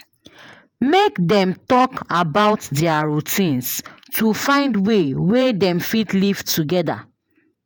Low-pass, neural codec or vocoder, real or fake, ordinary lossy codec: none; vocoder, 48 kHz, 128 mel bands, Vocos; fake; none